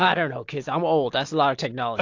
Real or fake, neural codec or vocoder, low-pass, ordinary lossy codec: real; none; 7.2 kHz; AAC, 48 kbps